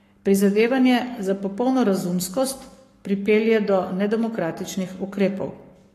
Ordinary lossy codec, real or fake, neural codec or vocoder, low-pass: AAC, 48 kbps; fake; codec, 44.1 kHz, 7.8 kbps, Pupu-Codec; 14.4 kHz